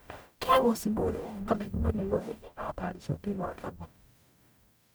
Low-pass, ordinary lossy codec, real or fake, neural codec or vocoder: none; none; fake; codec, 44.1 kHz, 0.9 kbps, DAC